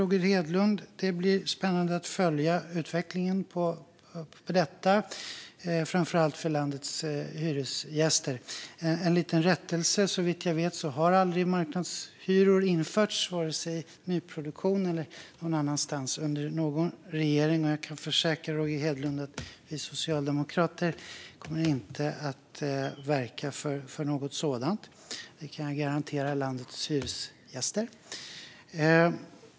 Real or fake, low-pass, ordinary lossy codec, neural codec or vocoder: real; none; none; none